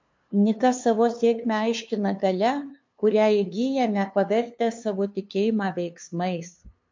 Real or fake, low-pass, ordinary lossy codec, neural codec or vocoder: fake; 7.2 kHz; MP3, 48 kbps; codec, 16 kHz, 2 kbps, FunCodec, trained on LibriTTS, 25 frames a second